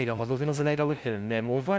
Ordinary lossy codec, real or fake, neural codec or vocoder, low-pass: none; fake; codec, 16 kHz, 0.5 kbps, FunCodec, trained on LibriTTS, 25 frames a second; none